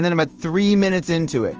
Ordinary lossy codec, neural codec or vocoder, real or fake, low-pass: Opus, 24 kbps; codec, 16 kHz in and 24 kHz out, 1 kbps, XY-Tokenizer; fake; 7.2 kHz